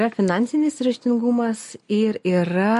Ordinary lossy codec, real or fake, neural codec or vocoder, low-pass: MP3, 48 kbps; real; none; 14.4 kHz